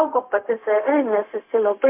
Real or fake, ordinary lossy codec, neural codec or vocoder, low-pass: fake; MP3, 32 kbps; codec, 16 kHz, 0.4 kbps, LongCat-Audio-Codec; 3.6 kHz